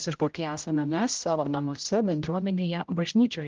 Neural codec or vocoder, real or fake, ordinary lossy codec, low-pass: codec, 16 kHz, 0.5 kbps, X-Codec, HuBERT features, trained on general audio; fake; Opus, 32 kbps; 7.2 kHz